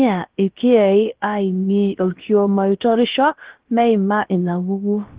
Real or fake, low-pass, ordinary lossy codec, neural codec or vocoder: fake; 3.6 kHz; Opus, 16 kbps; codec, 16 kHz, about 1 kbps, DyCAST, with the encoder's durations